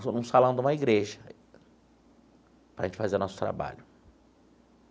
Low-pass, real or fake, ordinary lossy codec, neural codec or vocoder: none; real; none; none